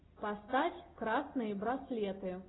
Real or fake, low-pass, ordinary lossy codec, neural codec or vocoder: real; 7.2 kHz; AAC, 16 kbps; none